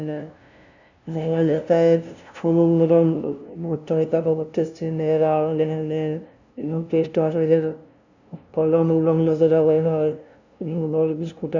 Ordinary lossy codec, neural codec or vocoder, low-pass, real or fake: none; codec, 16 kHz, 0.5 kbps, FunCodec, trained on LibriTTS, 25 frames a second; 7.2 kHz; fake